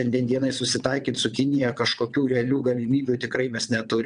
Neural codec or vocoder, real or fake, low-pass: vocoder, 22.05 kHz, 80 mel bands, WaveNeXt; fake; 9.9 kHz